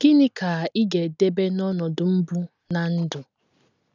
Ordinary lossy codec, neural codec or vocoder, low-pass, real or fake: none; none; 7.2 kHz; real